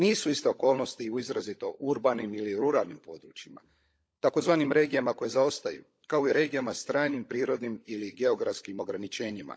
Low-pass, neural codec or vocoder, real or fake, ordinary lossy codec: none; codec, 16 kHz, 16 kbps, FunCodec, trained on LibriTTS, 50 frames a second; fake; none